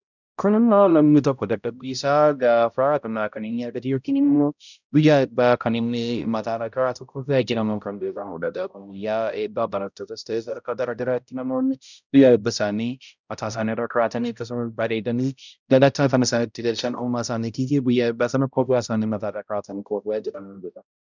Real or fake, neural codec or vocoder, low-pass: fake; codec, 16 kHz, 0.5 kbps, X-Codec, HuBERT features, trained on balanced general audio; 7.2 kHz